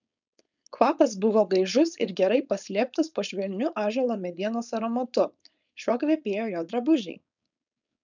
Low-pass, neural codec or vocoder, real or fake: 7.2 kHz; codec, 16 kHz, 4.8 kbps, FACodec; fake